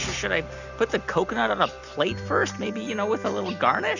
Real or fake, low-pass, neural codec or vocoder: real; 7.2 kHz; none